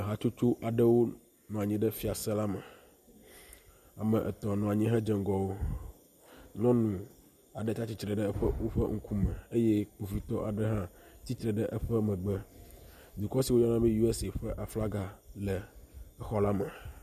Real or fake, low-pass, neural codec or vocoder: real; 14.4 kHz; none